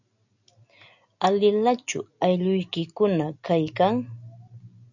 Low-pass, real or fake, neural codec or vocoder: 7.2 kHz; real; none